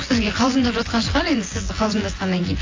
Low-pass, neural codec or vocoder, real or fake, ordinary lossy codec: 7.2 kHz; vocoder, 24 kHz, 100 mel bands, Vocos; fake; AAC, 32 kbps